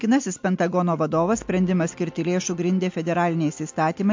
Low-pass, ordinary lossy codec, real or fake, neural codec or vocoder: 7.2 kHz; MP3, 48 kbps; real; none